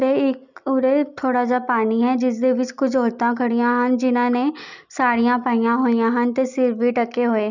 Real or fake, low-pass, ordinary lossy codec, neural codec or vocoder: real; 7.2 kHz; none; none